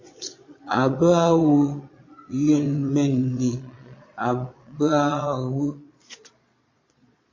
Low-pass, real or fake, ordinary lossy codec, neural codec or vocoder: 7.2 kHz; fake; MP3, 32 kbps; vocoder, 22.05 kHz, 80 mel bands, Vocos